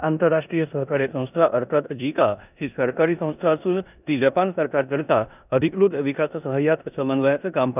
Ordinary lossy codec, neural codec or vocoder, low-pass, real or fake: none; codec, 16 kHz in and 24 kHz out, 0.9 kbps, LongCat-Audio-Codec, four codebook decoder; 3.6 kHz; fake